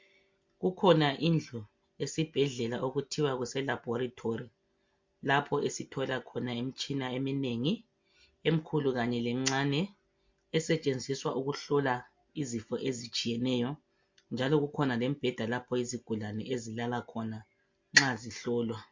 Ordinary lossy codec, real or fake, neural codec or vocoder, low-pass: MP3, 48 kbps; real; none; 7.2 kHz